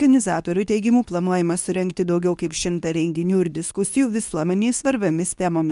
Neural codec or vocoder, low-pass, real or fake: codec, 24 kHz, 0.9 kbps, WavTokenizer, medium speech release version 2; 10.8 kHz; fake